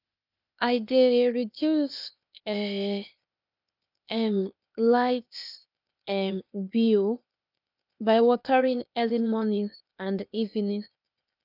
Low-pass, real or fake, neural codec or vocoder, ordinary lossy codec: 5.4 kHz; fake; codec, 16 kHz, 0.8 kbps, ZipCodec; none